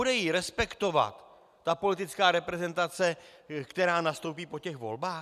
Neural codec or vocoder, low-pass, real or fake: none; 14.4 kHz; real